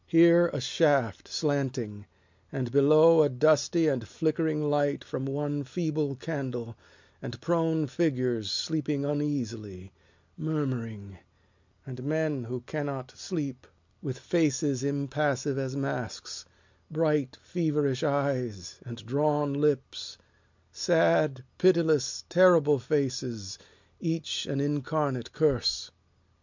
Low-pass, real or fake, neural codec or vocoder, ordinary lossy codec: 7.2 kHz; real; none; MP3, 64 kbps